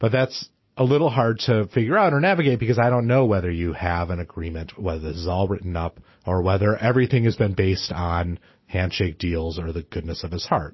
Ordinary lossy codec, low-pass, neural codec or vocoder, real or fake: MP3, 24 kbps; 7.2 kHz; none; real